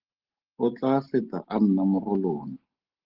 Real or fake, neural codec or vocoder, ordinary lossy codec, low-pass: real; none; Opus, 16 kbps; 5.4 kHz